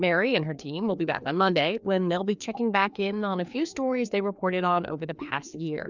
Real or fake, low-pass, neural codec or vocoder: fake; 7.2 kHz; codec, 16 kHz, 2 kbps, FreqCodec, larger model